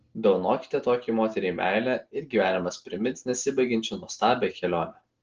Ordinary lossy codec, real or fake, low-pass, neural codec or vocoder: Opus, 16 kbps; real; 7.2 kHz; none